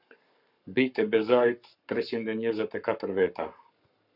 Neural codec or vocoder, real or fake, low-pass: codec, 44.1 kHz, 7.8 kbps, Pupu-Codec; fake; 5.4 kHz